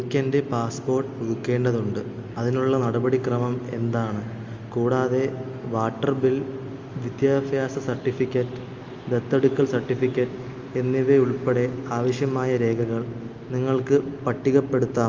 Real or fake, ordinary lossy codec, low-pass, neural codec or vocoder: real; Opus, 32 kbps; 7.2 kHz; none